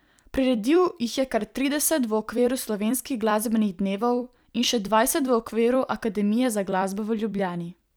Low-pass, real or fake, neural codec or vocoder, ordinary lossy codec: none; fake; vocoder, 44.1 kHz, 128 mel bands every 256 samples, BigVGAN v2; none